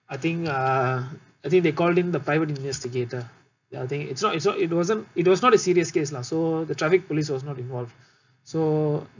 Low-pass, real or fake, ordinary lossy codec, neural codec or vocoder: 7.2 kHz; real; none; none